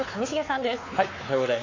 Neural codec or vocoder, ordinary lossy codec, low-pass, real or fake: codec, 16 kHz, 4 kbps, X-Codec, WavLM features, trained on Multilingual LibriSpeech; AAC, 32 kbps; 7.2 kHz; fake